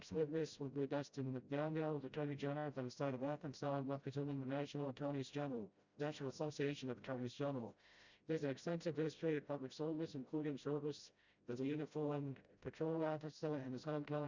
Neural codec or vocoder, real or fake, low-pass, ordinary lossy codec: codec, 16 kHz, 0.5 kbps, FreqCodec, smaller model; fake; 7.2 kHz; Opus, 64 kbps